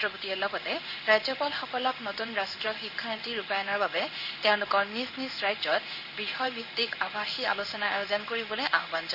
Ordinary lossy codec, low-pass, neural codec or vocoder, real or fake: none; 5.4 kHz; codec, 16 kHz in and 24 kHz out, 1 kbps, XY-Tokenizer; fake